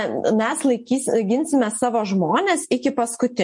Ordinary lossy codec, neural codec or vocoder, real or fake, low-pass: MP3, 48 kbps; none; real; 10.8 kHz